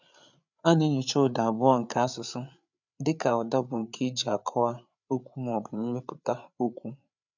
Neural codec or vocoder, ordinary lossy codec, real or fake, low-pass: codec, 16 kHz, 8 kbps, FreqCodec, larger model; none; fake; 7.2 kHz